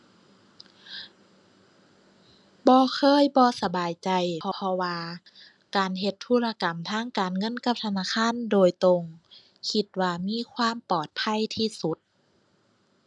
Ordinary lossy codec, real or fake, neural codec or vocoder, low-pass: none; real; none; 10.8 kHz